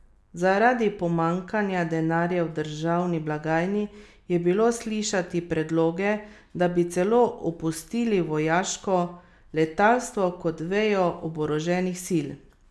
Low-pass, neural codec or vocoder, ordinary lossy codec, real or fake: none; none; none; real